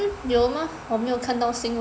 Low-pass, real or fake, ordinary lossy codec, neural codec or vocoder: none; real; none; none